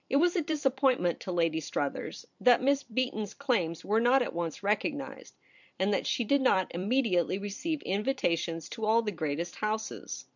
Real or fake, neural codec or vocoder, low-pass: fake; vocoder, 44.1 kHz, 128 mel bands every 256 samples, BigVGAN v2; 7.2 kHz